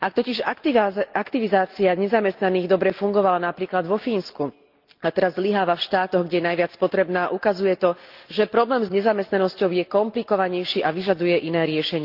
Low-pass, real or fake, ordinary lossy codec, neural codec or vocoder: 5.4 kHz; real; Opus, 32 kbps; none